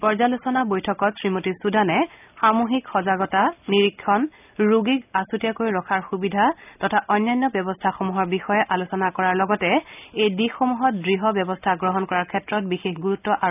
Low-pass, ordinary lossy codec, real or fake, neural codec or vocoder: 3.6 kHz; none; real; none